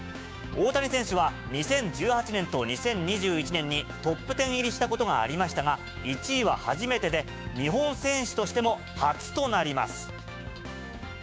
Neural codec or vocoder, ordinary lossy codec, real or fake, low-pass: codec, 16 kHz, 6 kbps, DAC; none; fake; none